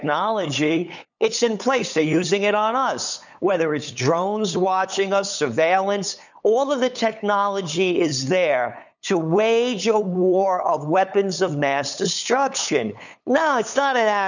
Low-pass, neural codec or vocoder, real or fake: 7.2 kHz; codec, 16 kHz, 8 kbps, FunCodec, trained on LibriTTS, 25 frames a second; fake